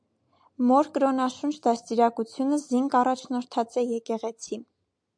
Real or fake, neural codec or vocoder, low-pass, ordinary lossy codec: real; none; 9.9 kHz; MP3, 64 kbps